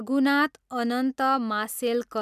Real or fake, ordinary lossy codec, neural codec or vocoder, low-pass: real; none; none; 14.4 kHz